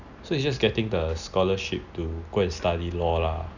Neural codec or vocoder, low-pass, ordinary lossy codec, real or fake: none; 7.2 kHz; none; real